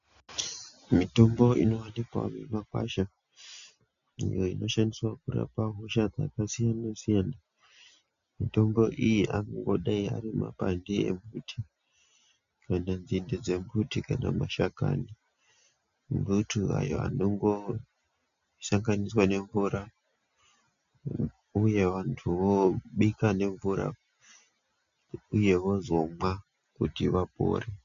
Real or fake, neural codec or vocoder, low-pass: real; none; 7.2 kHz